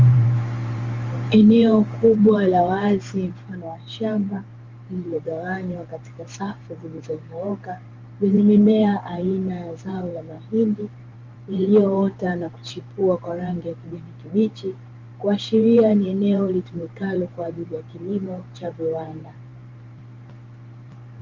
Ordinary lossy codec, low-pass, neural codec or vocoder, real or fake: Opus, 32 kbps; 7.2 kHz; vocoder, 44.1 kHz, 128 mel bands every 512 samples, BigVGAN v2; fake